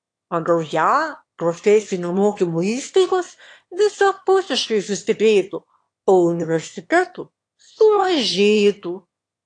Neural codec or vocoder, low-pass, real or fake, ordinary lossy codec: autoencoder, 22.05 kHz, a latent of 192 numbers a frame, VITS, trained on one speaker; 9.9 kHz; fake; AAC, 48 kbps